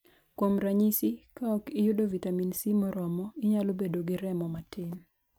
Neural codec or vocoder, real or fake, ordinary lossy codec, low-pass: none; real; none; none